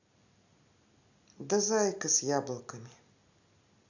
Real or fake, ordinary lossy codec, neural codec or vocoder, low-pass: real; none; none; 7.2 kHz